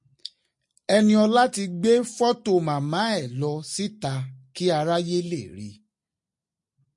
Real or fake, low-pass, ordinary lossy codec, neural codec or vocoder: real; 10.8 kHz; MP3, 48 kbps; none